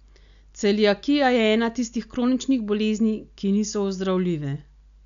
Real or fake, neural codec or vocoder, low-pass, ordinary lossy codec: real; none; 7.2 kHz; none